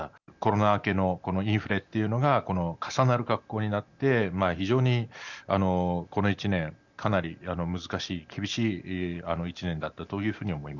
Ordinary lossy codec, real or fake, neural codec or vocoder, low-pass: none; real; none; 7.2 kHz